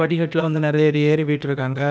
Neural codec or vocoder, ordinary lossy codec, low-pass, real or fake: codec, 16 kHz, 0.8 kbps, ZipCodec; none; none; fake